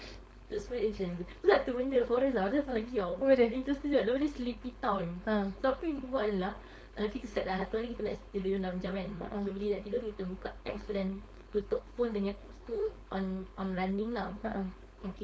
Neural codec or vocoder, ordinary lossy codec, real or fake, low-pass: codec, 16 kHz, 4.8 kbps, FACodec; none; fake; none